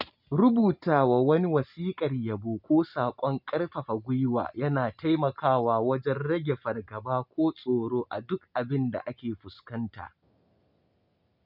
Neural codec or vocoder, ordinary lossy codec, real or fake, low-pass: none; none; real; 5.4 kHz